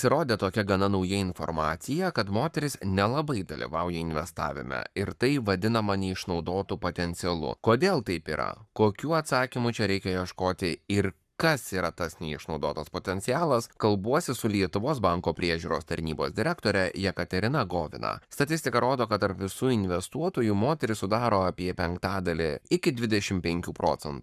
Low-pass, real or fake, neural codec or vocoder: 14.4 kHz; fake; codec, 44.1 kHz, 7.8 kbps, Pupu-Codec